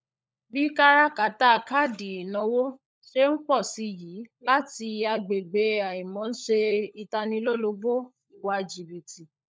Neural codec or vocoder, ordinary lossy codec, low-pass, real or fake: codec, 16 kHz, 16 kbps, FunCodec, trained on LibriTTS, 50 frames a second; none; none; fake